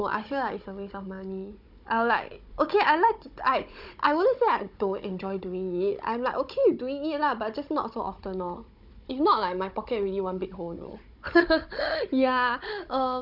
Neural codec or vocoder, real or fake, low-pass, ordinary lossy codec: codec, 16 kHz, 16 kbps, FunCodec, trained on Chinese and English, 50 frames a second; fake; 5.4 kHz; none